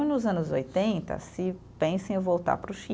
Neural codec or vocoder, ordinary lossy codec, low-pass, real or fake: none; none; none; real